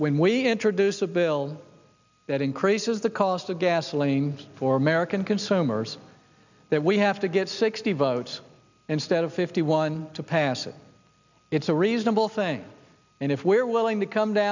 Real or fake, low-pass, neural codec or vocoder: real; 7.2 kHz; none